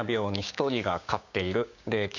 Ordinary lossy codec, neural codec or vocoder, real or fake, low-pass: none; codec, 16 kHz, 6 kbps, DAC; fake; 7.2 kHz